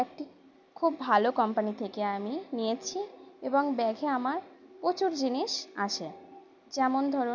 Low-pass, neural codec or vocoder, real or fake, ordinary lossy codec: 7.2 kHz; none; real; none